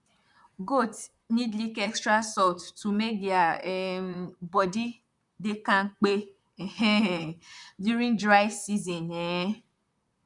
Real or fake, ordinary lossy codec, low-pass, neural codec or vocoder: fake; none; 10.8 kHz; vocoder, 44.1 kHz, 128 mel bands, Pupu-Vocoder